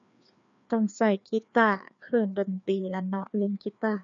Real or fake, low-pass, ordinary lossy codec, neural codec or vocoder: fake; 7.2 kHz; MP3, 96 kbps; codec, 16 kHz, 2 kbps, FreqCodec, larger model